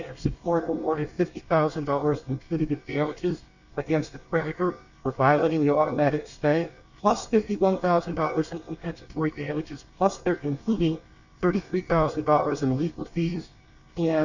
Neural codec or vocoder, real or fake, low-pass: codec, 24 kHz, 1 kbps, SNAC; fake; 7.2 kHz